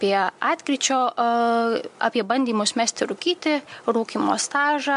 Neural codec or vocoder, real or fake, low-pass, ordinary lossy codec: none; real; 10.8 kHz; MP3, 64 kbps